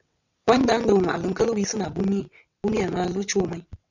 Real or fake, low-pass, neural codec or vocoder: fake; 7.2 kHz; vocoder, 22.05 kHz, 80 mel bands, WaveNeXt